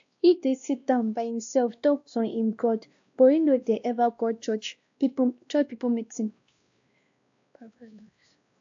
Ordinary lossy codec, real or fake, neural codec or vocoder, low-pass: AAC, 64 kbps; fake; codec, 16 kHz, 1 kbps, X-Codec, WavLM features, trained on Multilingual LibriSpeech; 7.2 kHz